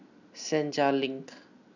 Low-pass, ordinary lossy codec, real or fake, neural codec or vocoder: 7.2 kHz; none; real; none